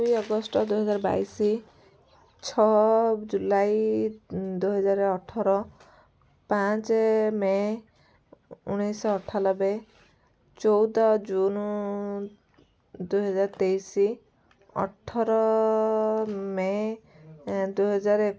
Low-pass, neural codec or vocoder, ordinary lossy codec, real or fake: none; none; none; real